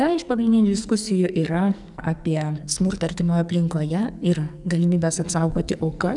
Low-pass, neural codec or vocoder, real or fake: 10.8 kHz; codec, 44.1 kHz, 2.6 kbps, SNAC; fake